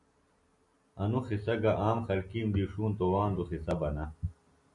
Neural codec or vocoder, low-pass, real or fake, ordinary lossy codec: none; 10.8 kHz; real; MP3, 48 kbps